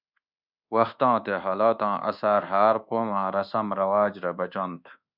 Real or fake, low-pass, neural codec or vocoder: fake; 5.4 kHz; codec, 24 kHz, 1.2 kbps, DualCodec